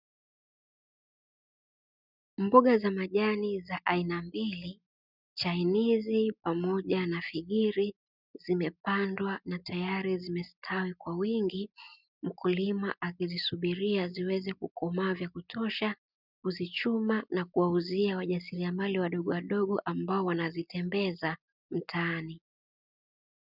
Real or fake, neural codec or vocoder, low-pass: real; none; 5.4 kHz